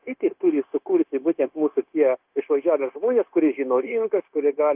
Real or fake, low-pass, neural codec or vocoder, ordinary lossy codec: fake; 3.6 kHz; codec, 16 kHz, 0.9 kbps, LongCat-Audio-Codec; Opus, 24 kbps